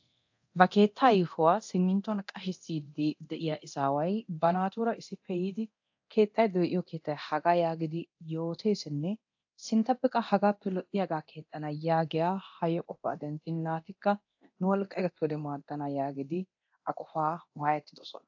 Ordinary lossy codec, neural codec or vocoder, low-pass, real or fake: AAC, 48 kbps; codec, 24 kHz, 0.9 kbps, DualCodec; 7.2 kHz; fake